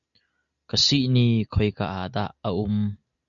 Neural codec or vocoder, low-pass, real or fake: none; 7.2 kHz; real